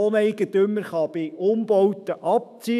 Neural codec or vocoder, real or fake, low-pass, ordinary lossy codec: autoencoder, 48 kHz, 128 numbers a frame, DAC-VAE, trained on Japanese speech; fake; 14.4 kHz; none